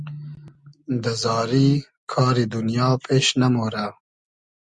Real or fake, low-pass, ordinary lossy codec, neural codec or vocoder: real; 10.8 kHz; Opus, 64 kbps; none